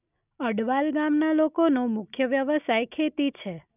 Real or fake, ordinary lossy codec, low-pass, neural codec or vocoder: real; none; 3.6 kHz; none